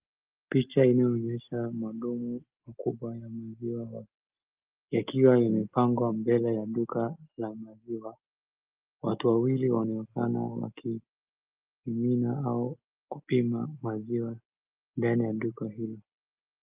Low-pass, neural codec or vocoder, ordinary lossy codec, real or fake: 3.6 kHz; none; Opus, 32 kbps; real